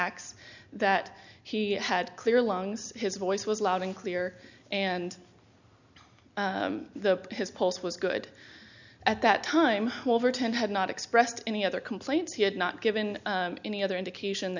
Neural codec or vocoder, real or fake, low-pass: none; real; 7.2 kHz